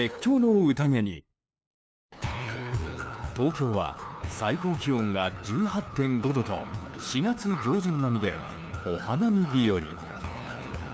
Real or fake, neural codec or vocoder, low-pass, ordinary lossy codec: fake; codec, 16 kHz, 2 kbps, FunCodec, trained on LibriTTS, 25 frames a second; none; none